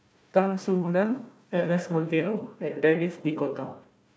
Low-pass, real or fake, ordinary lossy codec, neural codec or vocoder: none; fake; none; codec, 16 kHz, 1 kbps, FunCodec, trained on Chinese and English, 50 frames a second